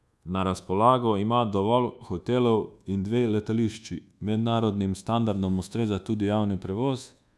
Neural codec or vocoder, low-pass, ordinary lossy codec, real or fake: codec, 24 kHz, 1.2 kbps, DualCodec; none; none; fake